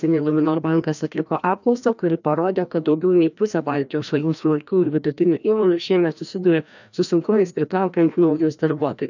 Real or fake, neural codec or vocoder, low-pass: fake; codec, 16 kHz, 1 kbps, FreqCodec, larger model; 7.2 kHz